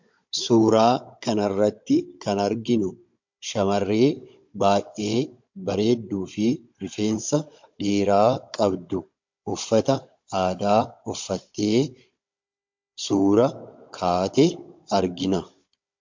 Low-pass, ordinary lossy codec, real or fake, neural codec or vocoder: 7.2 kHz; MP3, 48 kbps; fake; codec, 16 kHz, 16 kbps, FunCodec, trained on Chinese and English, 50 frames a second